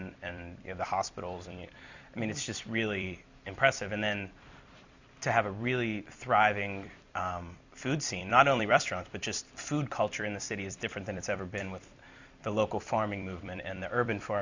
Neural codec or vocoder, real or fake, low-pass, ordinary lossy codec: vocoder, 44.1 kHz, 128 mel bands every 256 samples, BigVGAN v2; fake; 7.2 kHz; Opus, 64 kbps